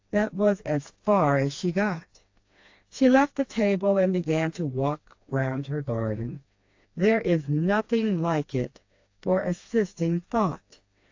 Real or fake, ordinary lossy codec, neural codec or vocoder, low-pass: fake; AAC, 48 kbps; codec, 16 kHz, 2 kbps, FreqCodec, smaller model; 7.2 kHz